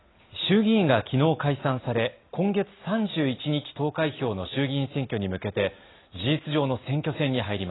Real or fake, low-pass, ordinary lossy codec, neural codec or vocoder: real; 7.2 kHz; AAC, 16 kbps; none